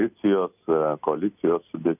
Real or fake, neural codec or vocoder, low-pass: real; none; 3.6 kHz